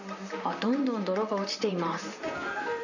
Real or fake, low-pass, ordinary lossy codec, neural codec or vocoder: fake; 7.2 kHz; none; vocoder, 44.1 kHz, 128 mel bands, Pupu-Vocoder